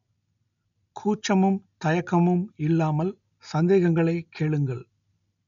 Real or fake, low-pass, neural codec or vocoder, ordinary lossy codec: real; 7.2 kHz; none; none